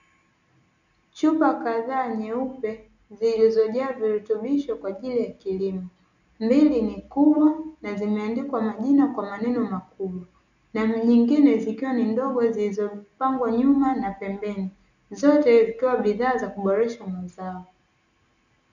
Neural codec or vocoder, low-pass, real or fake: none; 7.2 kHz; real